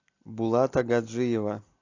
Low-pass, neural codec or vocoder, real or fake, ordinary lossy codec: 7.2 kHz; none; real; AAC, 48 kbps